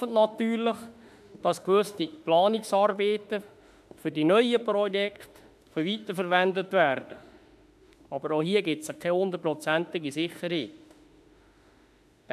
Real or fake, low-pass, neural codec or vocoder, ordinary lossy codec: fake; 14.4 kHz; autoencoder, 48 kHz, 32 numbers a frame, DAC-VAE, trained on Japanese speech; AAC, 96 kbps